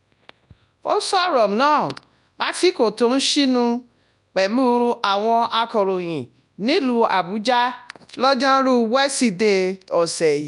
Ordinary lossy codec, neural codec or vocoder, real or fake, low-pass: none; codec, 24 kHz, 0.9 kbps, WavTokenizer, large speech release; fake; 10.8 kHz